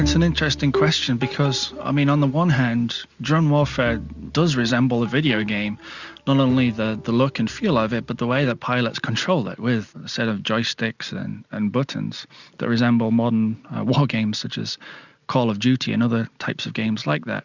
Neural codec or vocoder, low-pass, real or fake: none; 7.2 kHz; real